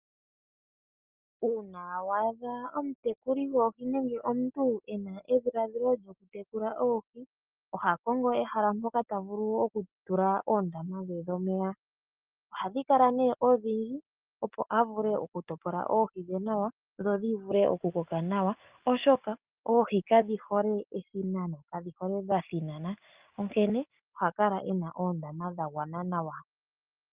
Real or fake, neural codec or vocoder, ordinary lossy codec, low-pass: real; none; Opus, 24 kbps; 3.6 kHz